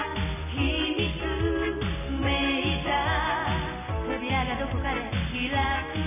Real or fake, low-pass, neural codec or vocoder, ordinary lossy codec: real; 3.6 kHz; none; none